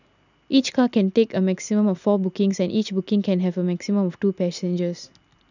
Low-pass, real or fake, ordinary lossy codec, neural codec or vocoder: 7.2 kHz; real; none; none